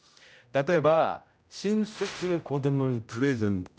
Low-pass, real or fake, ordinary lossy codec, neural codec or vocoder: none; fake; none; codec, 16 kHz, 0.5 kbps, X-Codec, HuBERT features, trained on general audio